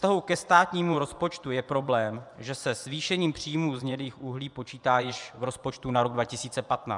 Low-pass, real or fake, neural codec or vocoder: 10.8 kHz; fake; vocoder, 24 kHz, 100 mel bands, Vocos